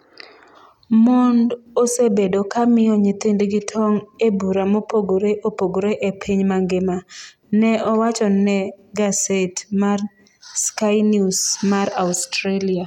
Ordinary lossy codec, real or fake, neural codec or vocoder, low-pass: none; real; none; 19.8 kHz